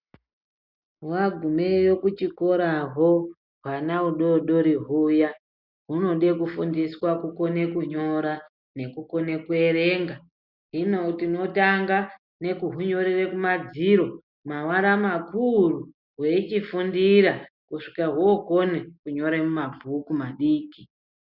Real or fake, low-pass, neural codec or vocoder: real; 5.4 kHz; none